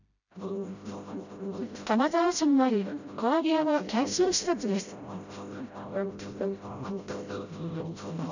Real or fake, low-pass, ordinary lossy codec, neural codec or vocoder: fake; 7.2 kHz; none; codec, 16 kHz, 0.5 kbps, FreqCodec, smaller model